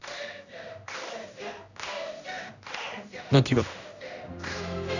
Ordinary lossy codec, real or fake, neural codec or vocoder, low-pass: none; fake; codec, 16 kHz, 1 kbps, X-Codec, HuBERT features, trained on general audio; 7.2 kHz